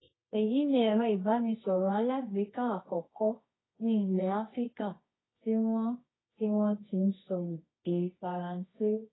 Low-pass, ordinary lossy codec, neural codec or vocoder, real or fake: 7.2 kHz; AAC, 16 kbps; codec, 24 kHz, 0.9 kbps, WavTokenizer, medium music audio release; fake